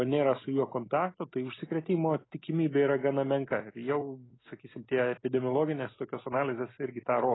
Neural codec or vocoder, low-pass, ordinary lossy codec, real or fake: none; 7.2 kHz; AAC, 16 kbps; real